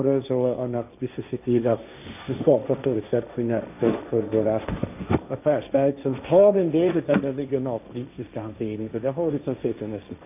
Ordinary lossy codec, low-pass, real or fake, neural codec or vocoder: none; 3.6 kHz; fake; codec, 16 kHz, 1.1 kbps, Voila-Tokenizer